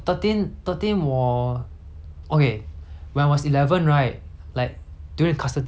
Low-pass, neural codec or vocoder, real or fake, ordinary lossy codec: none; none; real; none